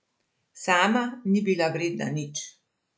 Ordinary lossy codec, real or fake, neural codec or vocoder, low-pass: none; real; none; none